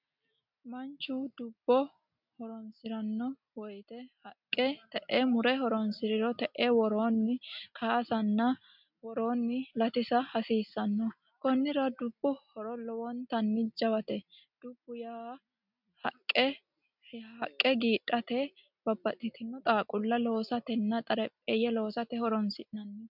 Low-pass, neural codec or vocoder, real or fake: 5.4 kHz; none; real